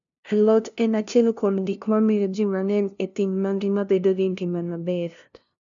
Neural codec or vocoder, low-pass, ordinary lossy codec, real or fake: codec, 16 kHz, 0.5 kbps, FunCodec, trained on LibriTTS, 25 frames a second; 7.2 kHz; none; fake